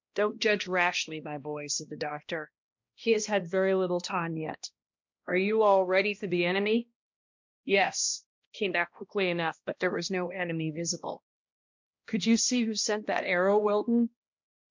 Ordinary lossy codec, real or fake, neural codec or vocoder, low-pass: MP3, 64 kbps; fake; codec, 16 kHz, 1 kbps, X-Codec, HuBERT features, trained on balanced general audio; 7.2 kHz